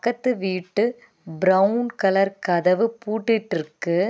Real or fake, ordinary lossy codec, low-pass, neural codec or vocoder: real; none; none; none